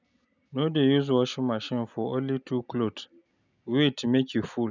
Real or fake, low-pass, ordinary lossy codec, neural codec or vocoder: real; 7.2 kHz; none; none